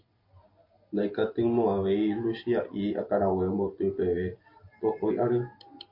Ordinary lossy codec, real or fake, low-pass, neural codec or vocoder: MP3, 32 kbps; real; 5.4 kHz; none